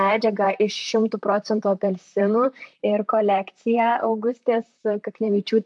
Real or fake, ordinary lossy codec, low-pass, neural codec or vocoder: fake; MP3, 64 kbps; 10.8 kHz; vocoder, 44.1 kHz, 128 mel bands, Pupu-Vocoder